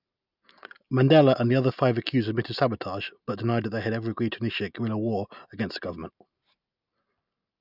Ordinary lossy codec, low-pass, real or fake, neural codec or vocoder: none; 5.4 kHz; real; none